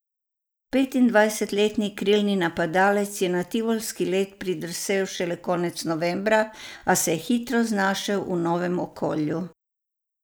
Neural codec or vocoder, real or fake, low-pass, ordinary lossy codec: none; real; none; none